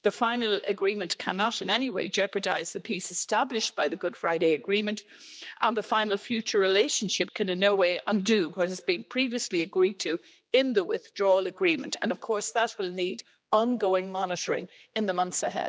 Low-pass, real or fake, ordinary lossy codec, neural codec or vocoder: none; fake; none; codec, 16 kHz, 2 kbps, X-Codec, HuBERT features, trained on general audio